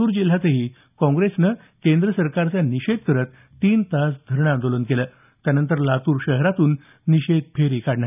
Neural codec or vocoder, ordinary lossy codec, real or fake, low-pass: none; none; real; 3.6 kHz